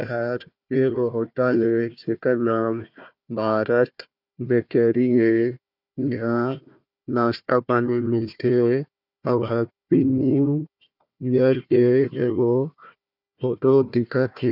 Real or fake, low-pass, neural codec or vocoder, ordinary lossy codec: fake; 5.4 kHz; codec, 16 kHz, 1 kbps, FunCodec, trained on Chinese and English, 50 frames a second; none